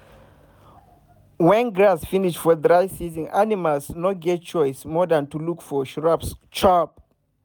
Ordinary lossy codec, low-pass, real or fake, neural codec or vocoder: none; none; real; none